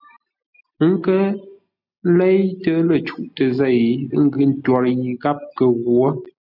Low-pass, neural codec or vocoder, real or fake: 5.4 kHz; none; real